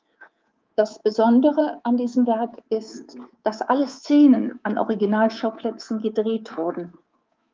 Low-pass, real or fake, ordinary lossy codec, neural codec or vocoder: 7.2 kHz; fake; Opus, 24 kbps; codec, 24 kHz, 3.1 kbps, DualCodec